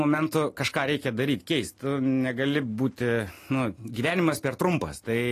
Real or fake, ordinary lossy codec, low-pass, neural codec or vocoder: real; AAC, 48 kbps; 14.4 kHz; none